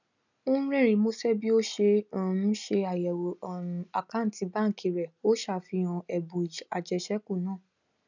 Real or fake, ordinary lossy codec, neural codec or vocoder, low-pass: real; none; none; 7.2 kHz